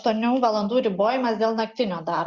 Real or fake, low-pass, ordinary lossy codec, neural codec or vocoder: real; 7.2 kHz; Opus, 64 kbps; none